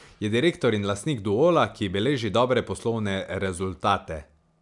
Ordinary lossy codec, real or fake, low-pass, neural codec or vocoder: none; real; 10.8 kHz; none